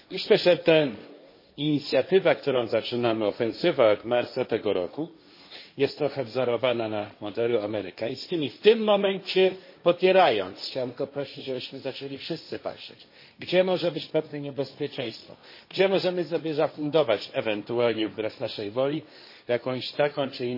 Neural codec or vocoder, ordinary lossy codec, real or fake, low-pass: codec, 16 kHz, 1.1 kbps, Voila-Tokenizer; MP3, 24 kbps; fake; 5.4 kHz